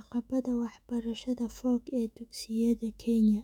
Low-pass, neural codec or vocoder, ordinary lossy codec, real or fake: 19.8 kHz; autoencoder, 48 kHz, 128 numbers a frame, DAC-VAE, trained on Japanese speech; none; fake